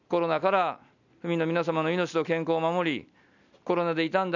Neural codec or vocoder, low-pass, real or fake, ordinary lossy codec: none; 7.2 kHz; real; none